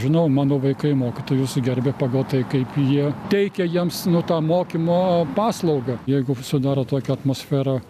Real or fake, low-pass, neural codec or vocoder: real; 14.4 kHz; none